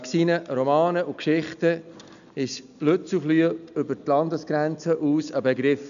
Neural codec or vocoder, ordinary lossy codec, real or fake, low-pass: none; none; real; 7.2 kHz